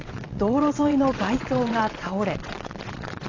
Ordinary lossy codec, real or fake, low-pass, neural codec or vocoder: AAC, 32 kbps; fake; 7.2 kHz; vocoder, 22.05 kHz, 80 mel bands, WaveNeXt